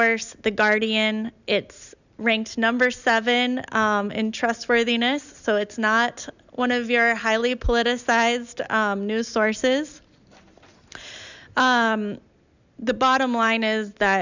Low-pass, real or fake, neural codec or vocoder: 7.2 kHz; real; none